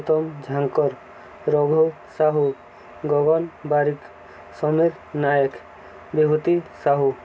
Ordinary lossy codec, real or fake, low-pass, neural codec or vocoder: none; real; none; none